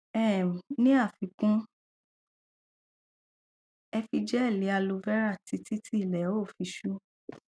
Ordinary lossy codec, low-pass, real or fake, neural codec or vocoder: none; none; real; none